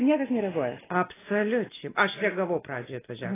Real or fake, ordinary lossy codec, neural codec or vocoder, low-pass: real; AAC, 16 kbps; none; 3.6 kHz